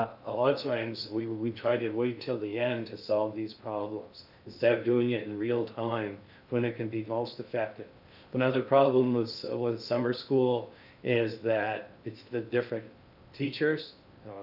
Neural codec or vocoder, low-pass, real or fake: codec, 16 kHz in and 24 kHz out, 0.6 kbps, FocalCodec, streaming, 2048 codes; 5.4 kHz; fake